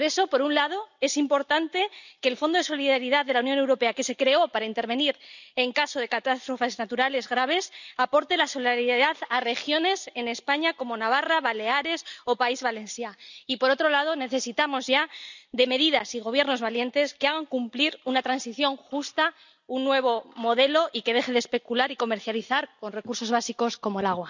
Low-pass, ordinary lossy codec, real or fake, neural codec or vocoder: 7.2 kHz; none; real; none